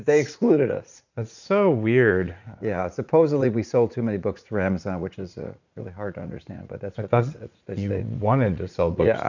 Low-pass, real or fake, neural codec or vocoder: 7.2 kHz; fake; vocoder, 44.1 kHz, 80 mel bands, Vocos